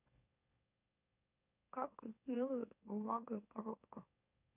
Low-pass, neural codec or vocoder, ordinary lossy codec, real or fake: 3.6 kHz; autoencoder, 44.1 kHz, a latent of 192 numbers a frame, MeloTTS; none; fake